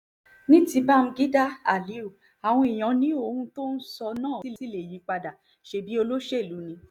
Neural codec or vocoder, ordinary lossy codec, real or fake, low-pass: none; none; real; 19.8 kHz